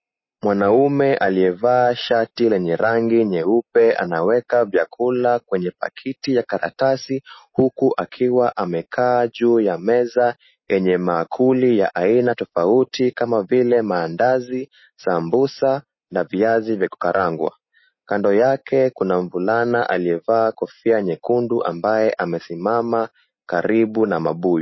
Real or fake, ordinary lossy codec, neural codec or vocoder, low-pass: real; MP3, 24 kbps; none; 7.2 kHz